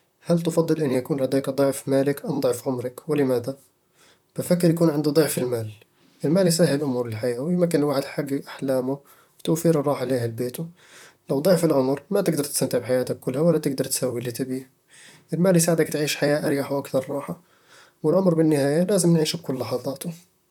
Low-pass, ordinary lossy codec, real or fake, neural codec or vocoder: 19.8 kHz; none; fake; vocoder, 44.1 kHz, 128 mel bands, Pupu-Vocoder